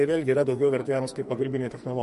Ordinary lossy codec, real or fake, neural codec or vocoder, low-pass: MP3, 48 kbps; fake; codec, 44.1 kHz, 2.6 kbps, SNAC; 14.4 kHz